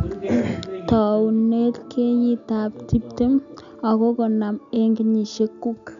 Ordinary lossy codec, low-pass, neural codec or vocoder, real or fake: none; 7.2 kHz; none; real